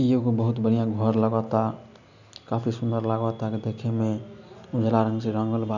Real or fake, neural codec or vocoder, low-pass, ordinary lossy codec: real; none; 7.2 kHz; none